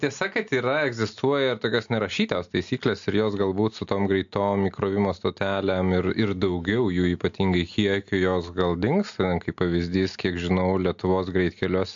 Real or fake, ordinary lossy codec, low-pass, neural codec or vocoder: real; AAC, 64 kbps; 7.2 kHz; none